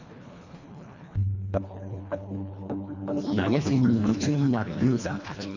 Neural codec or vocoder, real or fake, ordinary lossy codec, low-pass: codec, 24 kHz, 1.5 kbps, HILCodec; fake; none; 7.2 kHz